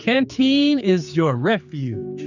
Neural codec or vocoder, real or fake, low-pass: codec, 16 kHz, 4 kbps, X-Codec, HuBERT features, trained on general audio; fake; 7.2 kHz